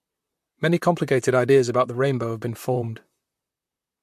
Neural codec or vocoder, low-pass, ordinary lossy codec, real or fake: vocoder, 44.1 kHz, 128 mel bands, Pupu-Vocoder; 14.4 kHz; MP3, 64 kbps; fake